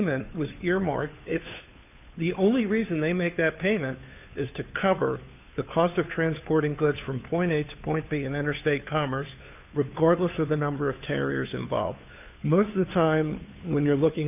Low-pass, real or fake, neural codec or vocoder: 3.6 kHz; fake; codec, 16 kHz, 2 kbps, FunCodec, trained on Chinese and English, 25 frames a second